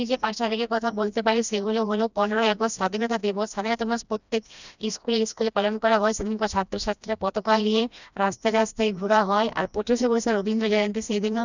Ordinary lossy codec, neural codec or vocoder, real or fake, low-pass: none; codec, 16 kHz, 1 kbps, FreqCodec, smaller model; fake; 7.2 kHz